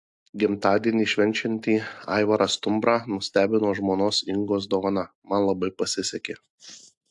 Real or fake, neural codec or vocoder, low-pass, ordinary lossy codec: real; none; 10.8 kHz; AAC, 64 kbps